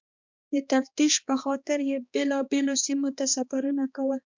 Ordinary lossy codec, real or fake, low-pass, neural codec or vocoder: MP3, 64 kbps; fake; 7.2 kHz; codec, 16 kHz, 2 kbps, X-Codec, HuBERT features, trained on balanced general audio